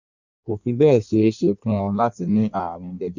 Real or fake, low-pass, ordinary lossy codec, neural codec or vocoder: fake; 7.2 kHz; none; codec, 16 kHz in and 24 kHz out, 1.1 kbps, FireRedTTS-2 codec